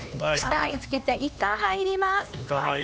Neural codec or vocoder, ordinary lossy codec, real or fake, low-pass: codec, 16 kHz, 2 kbps, X-Codec, HuBERT features, trained on LibriSpeech; none; fake; none